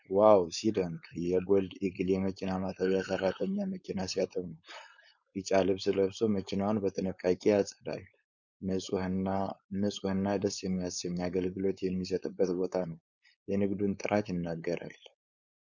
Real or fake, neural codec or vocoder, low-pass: fake; codec, 16 kHz, 4.8 kbps, FACodec; 7.2 kHz